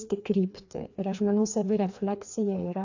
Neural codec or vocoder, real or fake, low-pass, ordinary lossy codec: codec, 16 kHz, 2 kbps, FreqCodec, larger model; fake; 7.2 kHz; AAC, 48 kbps